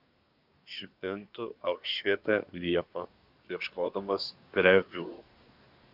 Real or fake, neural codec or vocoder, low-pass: fake; codec, 24 kHz, 1 kbps, SNAC; 5.4 kHz